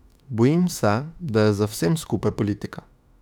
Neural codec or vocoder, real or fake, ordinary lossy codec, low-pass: autoencoder, 48 kHz, 32 numbers a frame, DAC-VAE, trained on Japanese speech; fake; none; 19.8 kHz